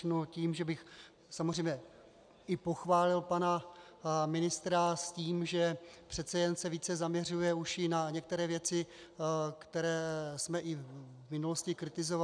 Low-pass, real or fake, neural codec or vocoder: 9.9 kHz; real; none